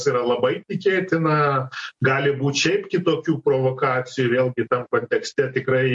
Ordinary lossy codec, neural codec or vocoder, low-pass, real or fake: MP3, 48 kbps; none; 10.8 kHz; real